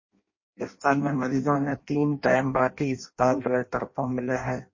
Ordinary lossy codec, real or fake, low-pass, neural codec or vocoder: MP3, 32 kbps; fake; 7.2 kHz; codec, 16 kHz in and 24 kHz out, 0.6 kbps, FireRedTTS-2 codec